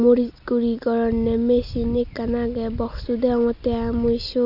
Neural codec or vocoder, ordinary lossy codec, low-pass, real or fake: none; AAC, 48 kbps; 5.4 kHz; real